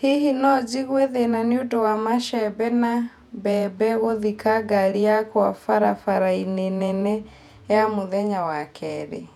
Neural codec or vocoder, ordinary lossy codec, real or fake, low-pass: vocoder, 48 kHz, 128 mel bands, Vocos; none; fake; 19.8 kHz